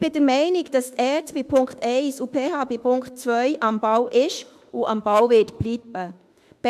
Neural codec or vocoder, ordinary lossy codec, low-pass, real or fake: autoencoder, 48 kHz, 32 numbers a frame, DAC-VAE, trained on Japanese speech; MP3, 96 kbps; 14.4 kHz; fake